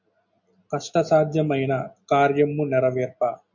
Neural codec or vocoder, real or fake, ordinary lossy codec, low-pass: none; real; MP3, 48 kbps; 7.2 kHz